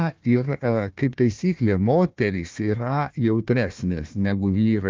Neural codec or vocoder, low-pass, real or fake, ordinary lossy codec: codec, 16 kHz, 1 kbps, FunCodec, trained on Chinese and English, 50 frames a second; 7.2 kHz; fake; Opus, 24 kbps